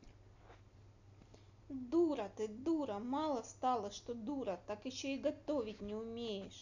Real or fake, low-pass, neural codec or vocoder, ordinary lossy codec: real; 7.2 kHz; none; none